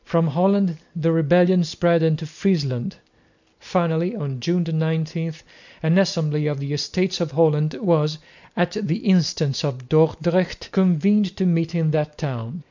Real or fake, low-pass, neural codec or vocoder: real; 7.2 kHz; none